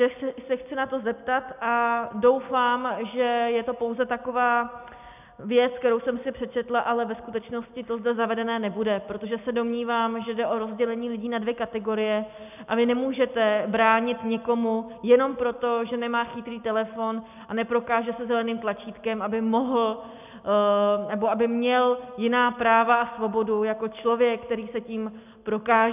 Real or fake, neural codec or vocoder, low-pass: real; none; 3.6 kHz